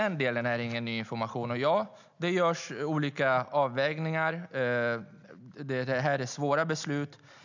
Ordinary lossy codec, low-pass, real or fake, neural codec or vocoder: none; 7.2 kHz; real; none